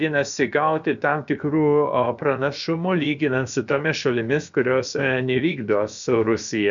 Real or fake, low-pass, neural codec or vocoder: fake; 7.2 kHz; codec, 16 kHz, about 1 kbps, DyCAST, with the encoder's durations